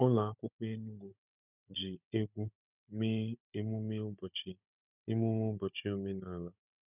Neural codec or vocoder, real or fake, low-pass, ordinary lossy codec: codec, 16 kHz, 16 kbps, FunCodec, trained on Chinese and English, 50 frames a second; fake; 3.6 kHz; none